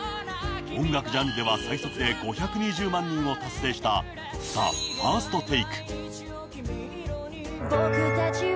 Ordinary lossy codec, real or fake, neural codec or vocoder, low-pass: none; real; none; none